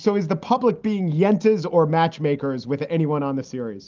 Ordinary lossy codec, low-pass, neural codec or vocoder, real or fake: Opus, 32 kbps; 7.2 kHz; none; real